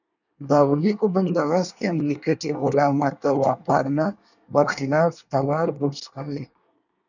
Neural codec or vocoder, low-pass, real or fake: codec, 24 kHz, 1 kbps, SNAC; 7.2 kHz; fake